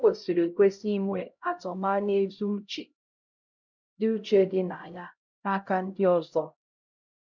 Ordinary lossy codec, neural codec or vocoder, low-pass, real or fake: none; codec, 16 kHz, 0.5 kbps, X-Codec, HuBERT features, trained on LibriSpeech; 7.2 kHz; fake